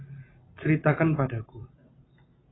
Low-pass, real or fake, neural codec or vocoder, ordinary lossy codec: 7.2 kHz; real; none; AAC, 16 kbps